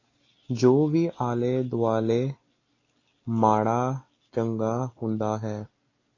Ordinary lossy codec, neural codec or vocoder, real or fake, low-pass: AAC, 32 kbps; none; real; 7.2 kHz